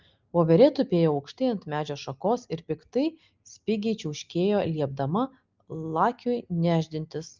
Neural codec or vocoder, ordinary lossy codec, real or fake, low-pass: none; Opus, 24 kbps; real; 7.2 kHz